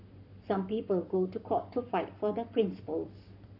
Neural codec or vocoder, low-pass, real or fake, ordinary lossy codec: codec, 44.1 kHz, 7.8 kbps, Pupu-Codec; 5.4 kHz; fake; none